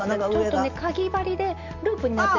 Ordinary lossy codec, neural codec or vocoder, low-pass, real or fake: MP3, 64 kbps; none; 7.2 kHz; real